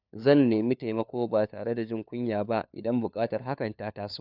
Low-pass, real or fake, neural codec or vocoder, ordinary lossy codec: 5.4 kHz; fake; codec, 16 kHz, 4 kbps, FunCodec, trained on LibriTTS, 50 frames a second; none